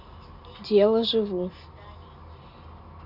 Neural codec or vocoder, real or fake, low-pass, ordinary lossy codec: none; real; 5.4 kHz; none